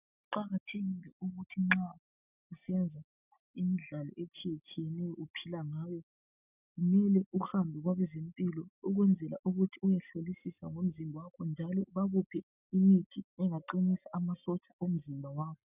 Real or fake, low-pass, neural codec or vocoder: real; 3.6 kHz; none